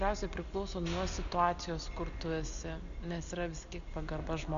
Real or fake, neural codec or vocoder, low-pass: real; none; 7.2 kHz